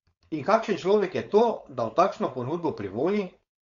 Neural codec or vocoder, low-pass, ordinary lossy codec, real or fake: codec, 16 kHz, 4.8 kbps, FACodec; 7.2 kHz; Opus, 64 kbps; fake